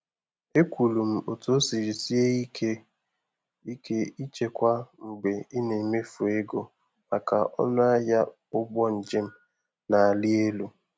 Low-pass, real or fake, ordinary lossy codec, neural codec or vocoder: none; real; none; none